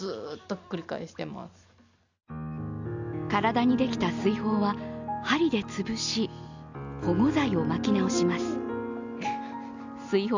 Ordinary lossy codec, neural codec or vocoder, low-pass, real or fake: none; none; 7.2 kHz; real